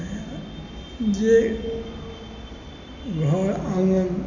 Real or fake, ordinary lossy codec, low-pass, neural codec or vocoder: real; none; 7.2 kHz; none